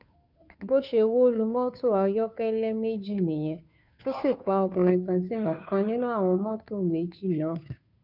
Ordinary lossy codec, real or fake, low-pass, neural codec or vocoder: none; fake; 5.4 kHz; codec, 16 kHz, 2 kbps, FunCodec, trained on Chinese and English, 25 frames a second